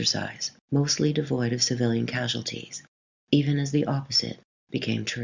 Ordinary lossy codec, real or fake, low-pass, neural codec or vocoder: Opus, 64 kbps; real; 7.2 kHz; none